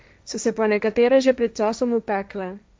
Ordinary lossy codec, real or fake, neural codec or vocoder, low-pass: none; fake; codec, 16 kHz, 1.1 kbps, Voila-Tokenizer; none